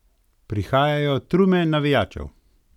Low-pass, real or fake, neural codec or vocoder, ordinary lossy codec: 19.8 kHz; real; none; none